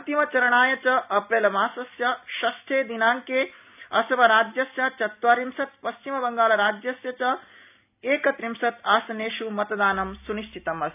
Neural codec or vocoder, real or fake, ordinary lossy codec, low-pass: none; real; none; 3.6 kHz